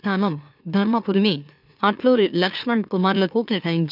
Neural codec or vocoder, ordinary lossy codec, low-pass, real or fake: autoencoder, 44.1 kHz, a latent of 192 numbers a frame, MeloTTS; none; 5.4 kHz; fake